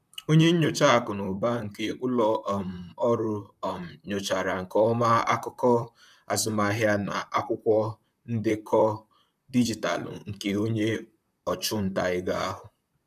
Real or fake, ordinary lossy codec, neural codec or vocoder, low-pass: fake; none; vocoder, 44.1 kHz, 128 mel bands, Pupu-Vocoder; 14.4 kHz